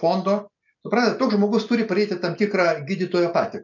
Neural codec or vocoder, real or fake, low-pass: none; real; 7.2 kHz